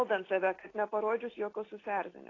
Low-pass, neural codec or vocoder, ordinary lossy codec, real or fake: 7.2 kHz; none; AAC, 32 kbps; real